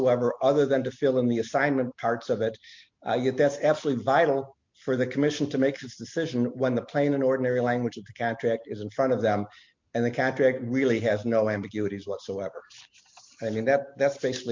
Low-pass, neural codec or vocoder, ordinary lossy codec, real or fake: 7.2 kHz; none; MP3, 48 kbps; real